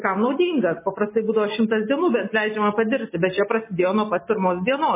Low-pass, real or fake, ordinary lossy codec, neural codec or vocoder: 3.6 kHz; real; MP3, 16 kbps; none